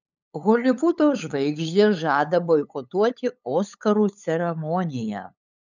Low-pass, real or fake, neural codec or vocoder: 7.2 kHz; fake; codec, 16 kHz, 8 kbps, FunCodec, trained on LibriTTS, 25 frames a second